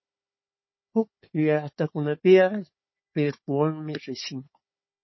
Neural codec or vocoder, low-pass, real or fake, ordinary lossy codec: codec, 16 kHz, 4 kbps, FunCodec, trained on Chinese and English, 50 frames a second; 7.2 kHz; fake; MP3, 24 kbps